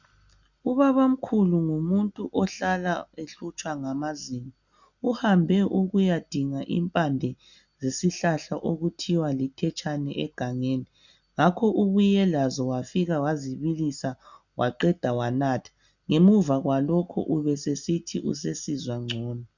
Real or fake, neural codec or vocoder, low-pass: real; none; 7.2 kHz